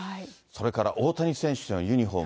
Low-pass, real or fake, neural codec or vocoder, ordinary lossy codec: none; real; none; none